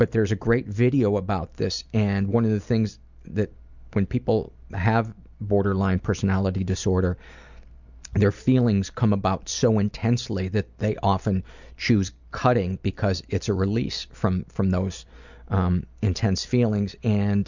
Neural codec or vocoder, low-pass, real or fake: none; 7.2 kHz; real